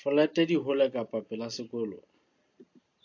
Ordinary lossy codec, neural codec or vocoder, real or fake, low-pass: AAC, 48 kbps; none; real; 7.2 kHz